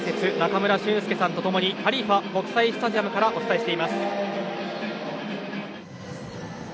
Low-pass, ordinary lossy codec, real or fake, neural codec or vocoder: none; none; real; none